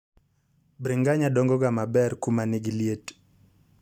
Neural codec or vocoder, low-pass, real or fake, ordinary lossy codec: none; 19.8 kHz; real; none